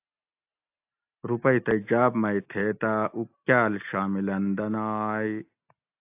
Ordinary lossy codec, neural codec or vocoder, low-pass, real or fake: AAC, 32 kbps; none; 3.6 kHz; real